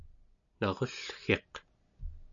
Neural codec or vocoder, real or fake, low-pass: none; real; 7.2 kHz